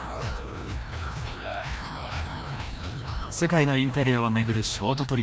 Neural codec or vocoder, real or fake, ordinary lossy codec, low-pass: codec, 16 kHz, 1 kbps, FreqCodec, larger model; fake; none; none